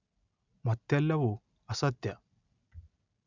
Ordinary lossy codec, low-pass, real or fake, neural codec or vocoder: none; 7.2 kHz; real; none